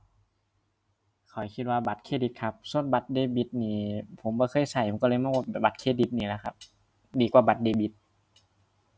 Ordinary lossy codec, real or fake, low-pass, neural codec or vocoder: none; real; none; none